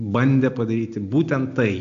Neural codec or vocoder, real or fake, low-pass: none; real; 7.2 kHz